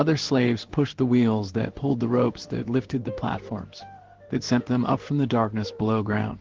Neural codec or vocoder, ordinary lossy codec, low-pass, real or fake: codec, 16 kHz in and 24 kHz out, 1 kbps, XY-Tokenizer; Opus, 16 kbps; 7.2 kHz; fake